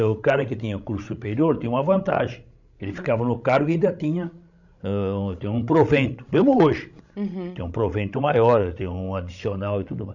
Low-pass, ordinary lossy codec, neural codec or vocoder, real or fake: 7.2 kHz; none; codec, 16 kHz, 16 kbps, FreqCodec, larger model; fake